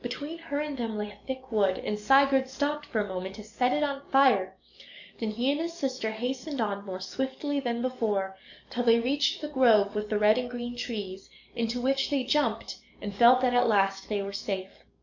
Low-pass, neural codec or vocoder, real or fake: 7.2 kHz; codec, 44.1 kHz, 7.8 kbps, DAC; fake